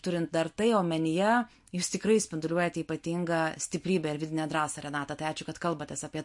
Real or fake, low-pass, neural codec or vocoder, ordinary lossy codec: real; 10.8 kHz; none; MP3, 48 kbps